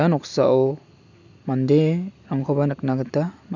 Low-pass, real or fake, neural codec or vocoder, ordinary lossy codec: 7.2 kHz; real; none; none